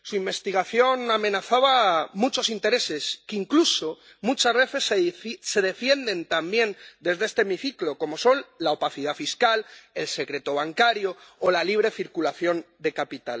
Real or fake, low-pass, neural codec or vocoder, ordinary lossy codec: real; none; none; none